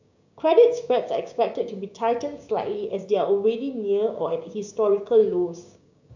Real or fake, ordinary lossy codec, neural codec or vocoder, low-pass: fake; none; codec, 16 kHz, 6 kbps, DAC; 7.2 kHz